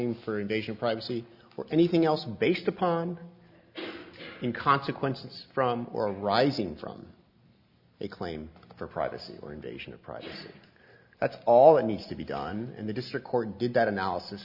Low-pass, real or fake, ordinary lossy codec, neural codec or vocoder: 5.4 kHz; real; Opus, 64 kbps; none